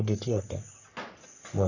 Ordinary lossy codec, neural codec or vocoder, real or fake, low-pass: none; codec, 44.1 kHz, 3.4 kbps, Pupu-Codec; fake; 7.2 kHz